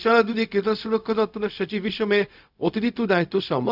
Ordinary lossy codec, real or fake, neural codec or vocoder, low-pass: none; fake; codec, 16 kHz, 0.4 kbps, LongCat-Audio-Codec; 5.4 kHz